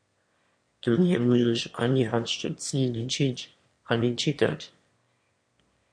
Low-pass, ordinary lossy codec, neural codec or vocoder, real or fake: 9.9 kHz; MP3, 48 kbps; autoencoder, 22.05 kHz, a latent of 192 numbers a frame, VITS, trained on one speaker; fake